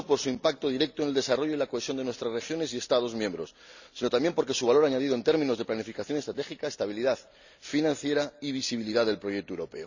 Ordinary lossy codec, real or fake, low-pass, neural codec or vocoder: none; real; 7.2 kHz; none